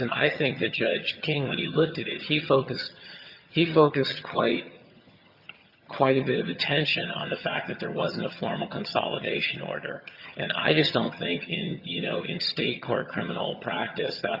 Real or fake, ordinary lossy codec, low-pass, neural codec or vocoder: fake; Opus, 64 kbps; 5.4 kHz; vocoder, 22.05 kHz, 80 mel bands, HiFi-GAN